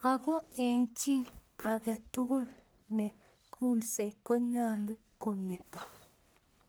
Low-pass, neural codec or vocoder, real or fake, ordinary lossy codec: none; codec, 44.1 kHz, 1.7 kbps, Pupu-Codec; fake; none